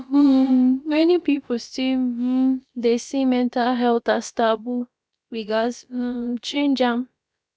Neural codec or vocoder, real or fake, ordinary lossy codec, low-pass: codec, 16 kHz, about 1 kbps, DyCAST, with the encoder's durations; fake; none; none